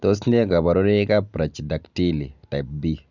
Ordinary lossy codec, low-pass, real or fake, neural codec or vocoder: none; 7.2 kHz; real; none